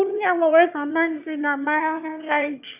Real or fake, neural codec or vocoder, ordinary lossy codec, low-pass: fake; autoencoder, 22.05 kHz, a latent of 192 numbers a frame, VITS, trained on one speaker; none; 3.6 kHz